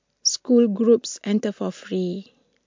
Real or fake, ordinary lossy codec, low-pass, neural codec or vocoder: real; MP3, 64 kbps; 7.2 kHz; none